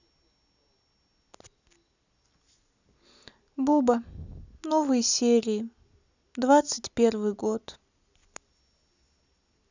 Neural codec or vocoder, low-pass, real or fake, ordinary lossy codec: none; 7.2 kHz; real; none